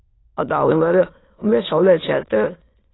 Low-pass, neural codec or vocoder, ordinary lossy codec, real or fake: 7.2 kHz; autoencoder, 22.05 kHz, a latent of 192 numbers a frame, VITS, trained on many speakers; AAC, 16 kbps; fake